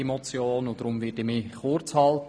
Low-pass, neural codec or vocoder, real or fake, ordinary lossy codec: none; none; real; none